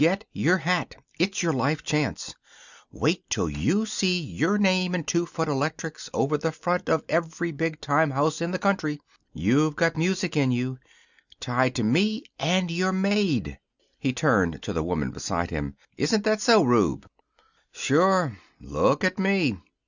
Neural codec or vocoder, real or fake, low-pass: none; real; 7.2 kHz